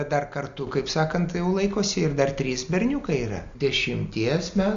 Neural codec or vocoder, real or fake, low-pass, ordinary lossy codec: none; real; 7.2 kHz; Opus, 64 kbps